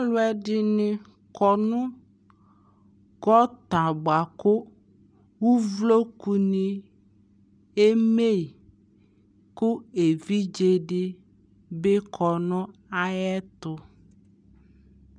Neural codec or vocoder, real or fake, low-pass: none; real; 9.9 kHz